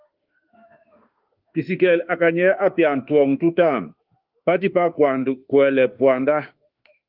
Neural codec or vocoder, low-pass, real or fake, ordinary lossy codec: autoencoder, 48 kHz, 32 numbers a frame, DAC-VAE, trained on Japanese speech; 5.4 kHz; fake; Opus, 32 kbps